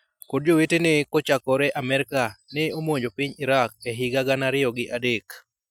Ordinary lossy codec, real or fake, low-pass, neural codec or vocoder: none; real; 19.8 kHz; none